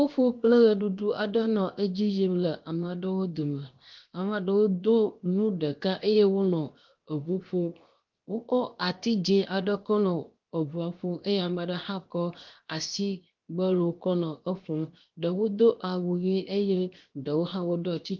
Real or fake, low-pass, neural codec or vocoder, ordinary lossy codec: fake; 7.2 kHz; codec, 16 kHz, 0.7 kbps, FocalCodec; Opus, 32 kbps